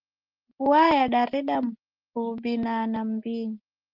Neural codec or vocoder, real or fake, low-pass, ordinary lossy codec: none; real; 5.4 kHz; Opus, 16 kbps